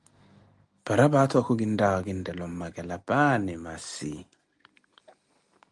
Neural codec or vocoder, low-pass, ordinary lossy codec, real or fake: none; 10.8 kHz; Opus, 32 kbps; real